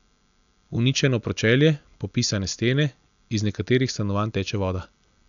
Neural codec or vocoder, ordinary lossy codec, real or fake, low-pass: none; none; real; 7.2 kHz